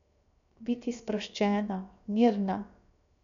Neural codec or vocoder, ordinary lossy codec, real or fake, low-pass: codec, 16 kHz, 0.7 kbps, FocalCodec; none; fake; 7.2 kHz